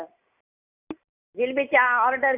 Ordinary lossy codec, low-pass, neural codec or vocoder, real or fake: none; 3.6 kHz; none; real